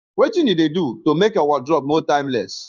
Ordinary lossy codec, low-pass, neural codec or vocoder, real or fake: none; 7.2 kHz; codec, 16 kHz in and 24 kHz out, 1 kbps, XY-Tokenizer; fake